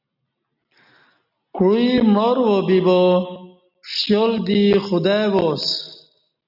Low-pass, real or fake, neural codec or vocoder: 5.4 kHz; real; none